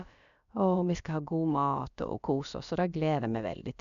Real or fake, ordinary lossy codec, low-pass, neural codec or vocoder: fake; none; 7.2 kHz; codec, 16 kHz, about 1 kbps, DyCAST, with the encoder's durations